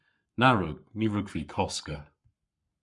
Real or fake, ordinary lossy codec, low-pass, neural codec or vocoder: fake; MP3, 96 kbps; 10.8 kHz; codec, 44.1 kHz, 7.8 kbps, Pupu-Codec